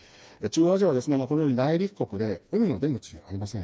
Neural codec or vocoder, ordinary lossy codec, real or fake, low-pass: codec, 16 kHz, 2 kbps, FreqCodec, smaller model; none; fake; none